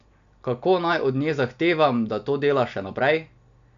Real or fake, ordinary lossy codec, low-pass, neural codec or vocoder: real; none; 7.2 kHz; none